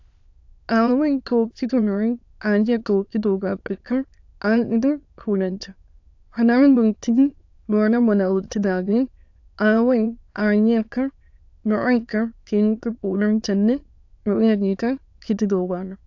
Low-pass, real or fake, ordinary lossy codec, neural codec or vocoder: 7.2 kHz; fake; AAC, 48 kbps; autoencoder, 22.05 kHz, a latent of 192 numbers a frame, VITS, trained on many speakers